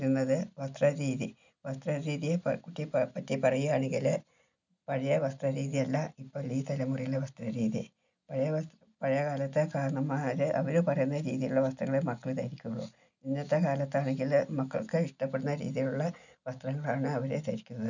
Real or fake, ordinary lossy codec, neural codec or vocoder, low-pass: real; none; none; 7.2 kHz